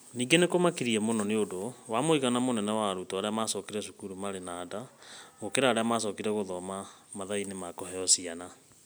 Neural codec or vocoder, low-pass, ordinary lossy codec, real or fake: none; none; none; real